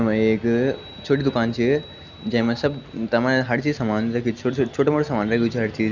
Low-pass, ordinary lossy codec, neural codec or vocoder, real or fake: 7.2 kHz; none; none; real